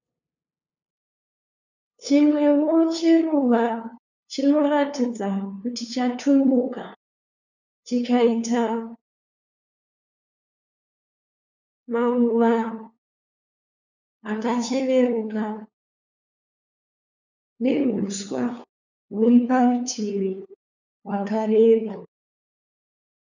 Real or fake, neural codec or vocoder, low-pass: fake; codec, 16 kHz, 2 kbps, FunCodec, trained on LibriTTS, 25 frames a second; 7.2 kHz